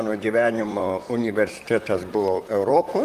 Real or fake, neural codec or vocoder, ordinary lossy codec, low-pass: fake; vocoder, 44.1 kHz, 128 mel bands, Pupu-Vocoder; Opus, 32 kbps; 14.4 kHz